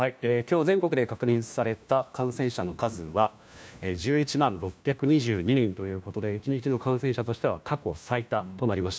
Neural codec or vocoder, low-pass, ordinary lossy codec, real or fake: codec, 16 kHz, 1 kbps, FunCodec, trained on LibriTTS, 50 frames a second; none; none; fake